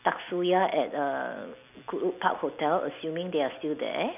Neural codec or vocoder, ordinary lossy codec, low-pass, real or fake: none; none; 3.6 kHz; real